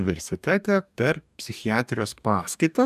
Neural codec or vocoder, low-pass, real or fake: codec, 44.1 kHz, 2.6 kbps, DAC; 14.4 kHz; fake